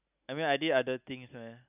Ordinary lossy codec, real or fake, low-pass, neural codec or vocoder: none; real; 3.6 kHz; none